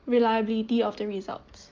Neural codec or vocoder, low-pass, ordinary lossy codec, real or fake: none; 7.2 kHz; Opus, 32 kbps; real